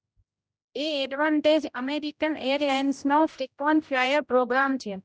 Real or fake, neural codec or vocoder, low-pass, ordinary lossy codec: fake; codec, 16 kHz, 0.5 kbps, X-Codec, HuBERT features, trained on general audio; none; none